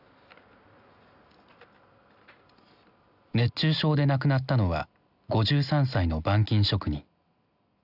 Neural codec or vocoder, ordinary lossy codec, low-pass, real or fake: none; none; 5.4 kHz; real